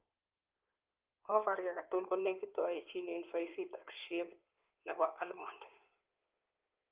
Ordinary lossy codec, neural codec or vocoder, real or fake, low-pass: Opus, 32 kbps; codec, 16 kHz in and 24 kHz out, 2.2 kbps, FireRedTTS-2 codec; fake; 3.6 kHz